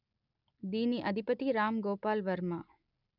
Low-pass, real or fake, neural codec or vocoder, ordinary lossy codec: 5.4 kHz; real; none; none